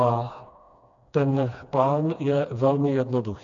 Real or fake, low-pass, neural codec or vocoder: fake; 7.2 kHz; codec, 16 kHz, 2 kbps, FreqCodec, smaller model